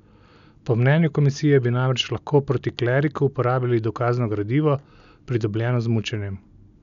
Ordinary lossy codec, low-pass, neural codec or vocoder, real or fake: none; 7.2 kHz; none; real